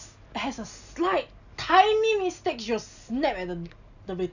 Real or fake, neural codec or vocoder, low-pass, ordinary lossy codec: real; none; 7.2 kHz; none